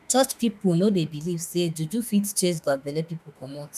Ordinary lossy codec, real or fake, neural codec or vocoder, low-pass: none; fake; autoencoder, 48 kHz, 32 numbers a frame, DAC-VAE, trained on Japanese speech; 14.4 kHz